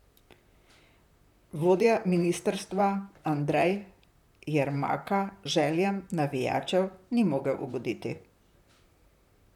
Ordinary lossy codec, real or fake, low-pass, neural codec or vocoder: none; fake; 19.8 kHz; vocoder, 44.1 kHz, 128 mel bands, Pupu-Vocoder